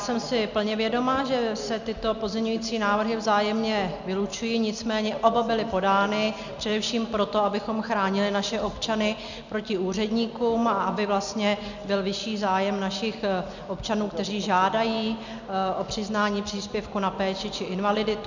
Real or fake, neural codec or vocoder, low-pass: real; none; 7.2 kHz